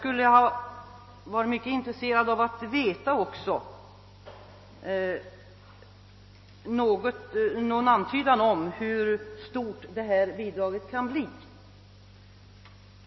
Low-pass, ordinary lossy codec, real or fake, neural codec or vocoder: 7.2 kHz; MP3, 24 kbps; real; none